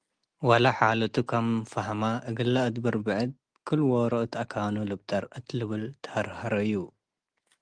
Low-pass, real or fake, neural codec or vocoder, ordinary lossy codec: 9.9 kHz; real; none; Opus, 24 kbps